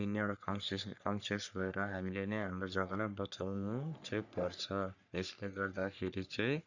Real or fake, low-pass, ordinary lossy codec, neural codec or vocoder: fake; 7.2 kHz; none; codec, 44.1 kHz, 3.4 kbps, Pupu-Codec